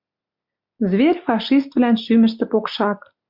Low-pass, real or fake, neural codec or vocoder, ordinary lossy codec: 5.4 kHz; real; none; MP3, 48 kbps